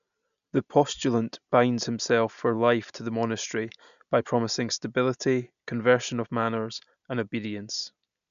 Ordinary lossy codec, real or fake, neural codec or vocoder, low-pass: none; real; none; 7.2 kHz